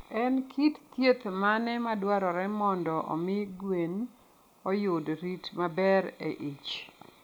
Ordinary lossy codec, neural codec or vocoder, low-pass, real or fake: none; none; none; real